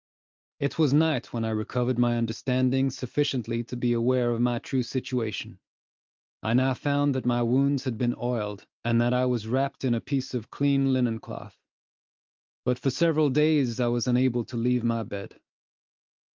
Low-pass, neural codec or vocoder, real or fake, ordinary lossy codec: 7.2 kHz; none; real; Opus, 24 kbps